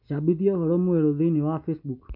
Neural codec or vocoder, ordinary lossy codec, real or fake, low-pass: none; none; real; 5.4 kHz